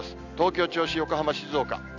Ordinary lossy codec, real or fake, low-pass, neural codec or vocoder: none; real; 7.2 kHz; none